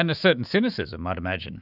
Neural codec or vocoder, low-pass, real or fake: autoencoder, 48 kHz, 128 numbers a frame, DAC-VAE, trained on Japanese speech; 5.4 kHz; fake